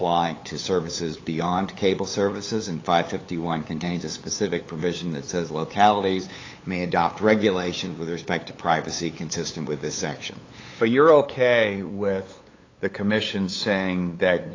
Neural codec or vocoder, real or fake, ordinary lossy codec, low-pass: codec, 16 kHz, 8 kbps, FunCodec, trained on LibriTTS, 25 frames a second; fake; AAC, 32 kbps; 7.2 kHz